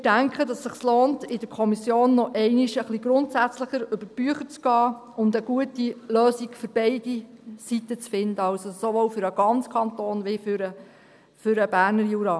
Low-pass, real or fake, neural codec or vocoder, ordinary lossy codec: none; real; none; none